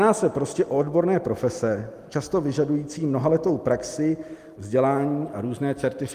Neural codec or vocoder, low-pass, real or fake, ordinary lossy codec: none; 14.4 kHz; real; Opus, 32 kbps